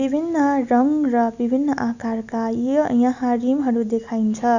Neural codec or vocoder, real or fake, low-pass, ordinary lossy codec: none; real; 7.2 kHz; none